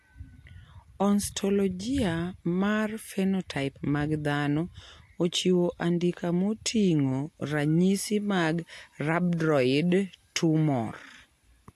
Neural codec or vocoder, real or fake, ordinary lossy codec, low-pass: none; real; AAC, 64 kbps; 14.4 kHz